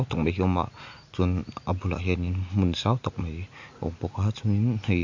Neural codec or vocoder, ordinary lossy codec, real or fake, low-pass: none; MP3, 48 kbps; real; 7.2 kHz